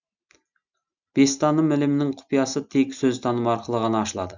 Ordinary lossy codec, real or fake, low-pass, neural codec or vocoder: Opus, 64 kbps; real; 7.2 kHz; none